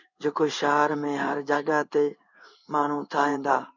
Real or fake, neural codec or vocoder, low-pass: fake; codec, 16 kHz in and 24 kHz out, 1 kbps, XY-Tokenizer; 7.2 kHz